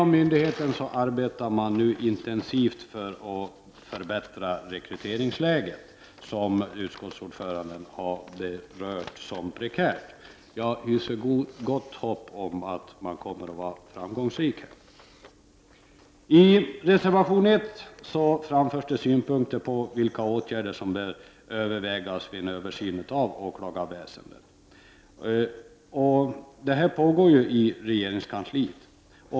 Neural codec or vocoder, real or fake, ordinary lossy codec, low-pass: none; real; none; none